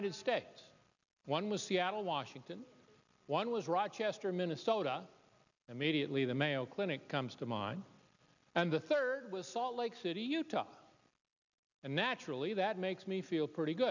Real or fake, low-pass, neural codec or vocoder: real; 7.2 kHz; none